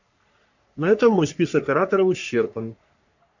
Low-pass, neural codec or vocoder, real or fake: 7.2 kHz; codec, 44.1 kHz, 3.4 kbps, Pupu-Codec; fake